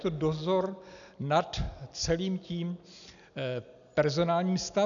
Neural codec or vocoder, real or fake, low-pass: none; real; 7.2 kHz